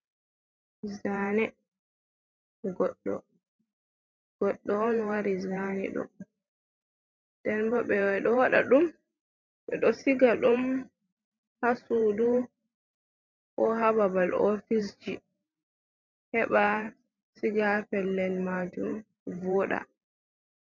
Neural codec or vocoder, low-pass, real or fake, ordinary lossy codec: vocoder, 44.1 kHz, 128 mel bands every 512 samples, BigVGAN v2; 7.2 kHz; fake; MP3, 64 kbps